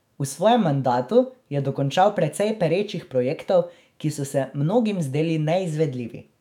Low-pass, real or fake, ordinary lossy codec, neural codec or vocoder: 19.8 kHz; fake; none; autoencoder, 48 kHz, 128 numbers a frame, DAC-VAE, trained on Japanese speech